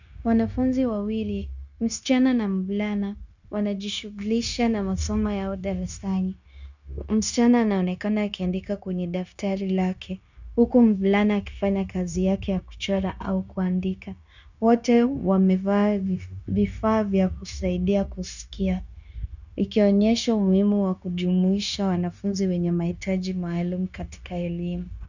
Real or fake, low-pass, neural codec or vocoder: fake; 7.2 kHz; codec, 16 kHz, 0.9 kbps, LongCat-Audio-Codec